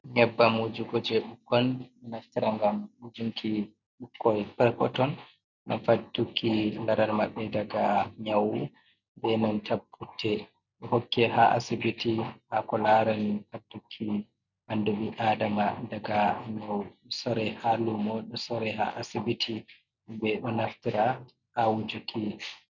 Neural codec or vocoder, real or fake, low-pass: none; real; 7.2 kHz